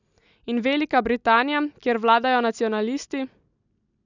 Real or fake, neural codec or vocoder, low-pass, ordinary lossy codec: real; none; 7.2 kHz; none